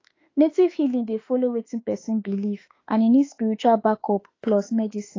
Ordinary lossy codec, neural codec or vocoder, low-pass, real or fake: AAC, 32 kbps; autoencoder, 48 kHz, 32 numbers a frame, DAC-VAE, trained on Japanese speech; 7.2 kHz; fake